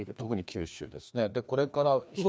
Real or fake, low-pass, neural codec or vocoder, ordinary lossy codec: fake; none; codec, 16 kHz, 2 kbps, FreqCodec, larger model; none